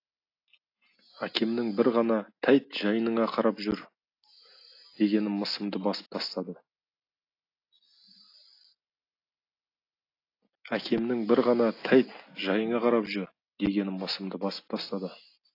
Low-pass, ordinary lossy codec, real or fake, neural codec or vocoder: 5.4 kHz; AAC, 32 kbps; real; none